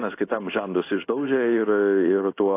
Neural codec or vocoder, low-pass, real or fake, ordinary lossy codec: codec, 16 kHz in and 24 kHz out, 1 kbps, XY-Tokenizer; 3.6 kHz; fake; AAC, 24 kbps